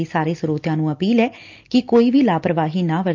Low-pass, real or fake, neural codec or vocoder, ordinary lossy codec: 7.2 kHz; real; none; Opus, 24 kbps